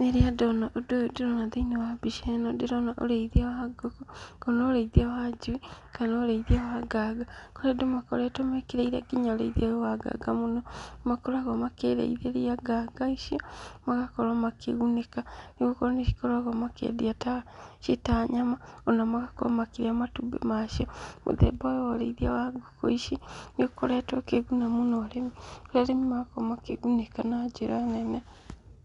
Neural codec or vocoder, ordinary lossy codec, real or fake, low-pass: none; none; real; 10.8 kHz